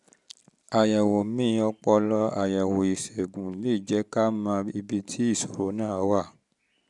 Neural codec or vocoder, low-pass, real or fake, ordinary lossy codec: none; 10.8 kHz; real; none